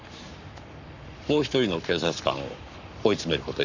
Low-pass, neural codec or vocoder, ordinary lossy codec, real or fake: 7.2 kHz; codec, 44.1 kHz, 7.8 kbps, Pupu-Codec; none; fake